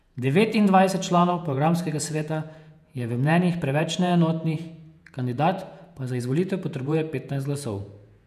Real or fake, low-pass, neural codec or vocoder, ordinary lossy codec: fake; 14.4 kHz; vocoder, 48 kHz, 128 mel bands, Vocos; none